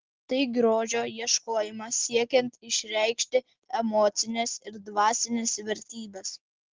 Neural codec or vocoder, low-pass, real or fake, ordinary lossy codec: none; 7.2 kHz; real; Opus, 16 kbps